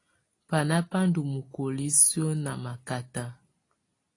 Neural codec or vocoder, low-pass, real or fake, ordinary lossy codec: none; 10.8 kHz; real; AAC, 32 kbps